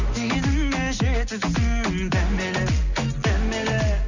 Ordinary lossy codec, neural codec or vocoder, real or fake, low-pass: none; none; real; 7.2 kHz